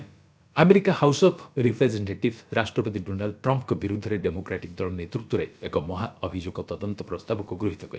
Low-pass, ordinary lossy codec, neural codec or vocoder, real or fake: none; none; codec, 16 kHz, about 1 kbps, DyCAST, with the encoder's durations; fake